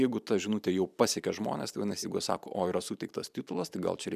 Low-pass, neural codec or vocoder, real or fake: 14.4 kHz; vocoder, 44.1 kHz, 128 mel bands every 512 samples, BigVGAN v2; fake